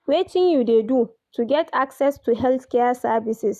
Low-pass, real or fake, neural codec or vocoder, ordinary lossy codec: 14.4 kHz; real; none; none